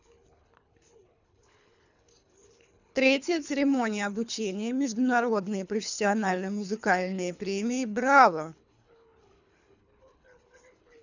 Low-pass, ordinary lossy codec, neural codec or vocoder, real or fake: 7.2 kHz; none; codec, 24 kHz, 3 kbps, HILCodec; fake